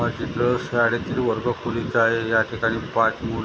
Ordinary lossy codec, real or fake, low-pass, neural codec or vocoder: none; real; none; none